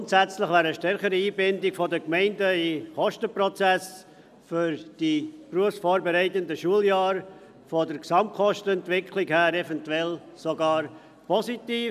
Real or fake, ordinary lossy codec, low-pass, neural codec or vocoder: real; none; 14.4 kHz; none